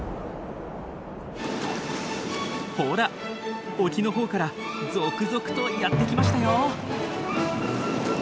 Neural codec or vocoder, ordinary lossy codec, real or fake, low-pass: none; none; real; none